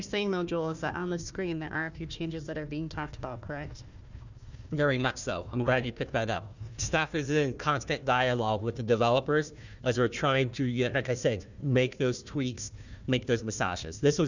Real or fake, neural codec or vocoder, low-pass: fake; codec, 16 kHz, 1 kbps, FunCodec, trained on Chinese and English, 50 frames a second; 7.2 kHz